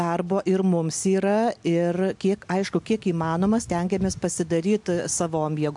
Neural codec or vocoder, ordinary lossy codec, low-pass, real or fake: none; MP3, 64 kbps; 10.8 kHz; real